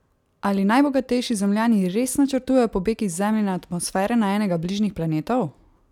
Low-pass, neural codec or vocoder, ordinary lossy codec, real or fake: 19.8 kHz; none; none; real